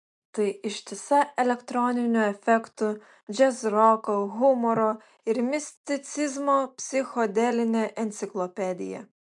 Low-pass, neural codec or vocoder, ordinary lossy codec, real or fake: 10.8 kHz; none; MP3, 64 kbps; real